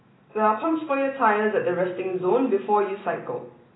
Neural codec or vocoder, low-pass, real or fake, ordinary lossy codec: none; 7.2 kHz; real; AAC, 16 kbps